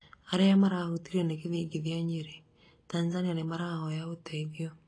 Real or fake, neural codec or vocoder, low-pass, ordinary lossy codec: real; none; 9.9 kHz; AAC, 32 kbps